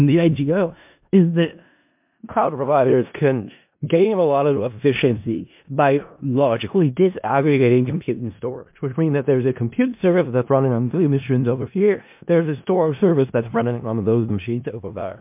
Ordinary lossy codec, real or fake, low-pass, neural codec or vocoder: MP3, 32 kbps; fake; 3.6 kHz; codec, 16 kHz in and 24 kHz out, 0.4 kbps, LongCat-Audio-Codec, four codebook decoder